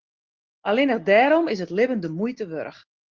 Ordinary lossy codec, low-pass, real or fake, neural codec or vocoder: Opus, 16 kbps; 7.2 kHz; real; none